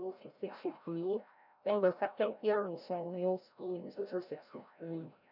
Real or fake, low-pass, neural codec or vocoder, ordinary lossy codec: fake; 5.4 kHz; codec, 16 kHz, 0.5 kbps, FreqCodec, larger model; none